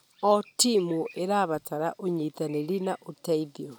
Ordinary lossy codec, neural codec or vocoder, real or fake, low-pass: none; none; real; none